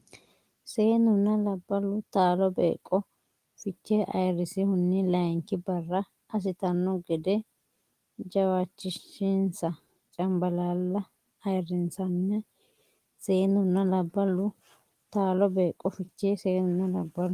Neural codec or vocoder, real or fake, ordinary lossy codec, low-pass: none; real; Opus, 24 kbps; 14.4 kHz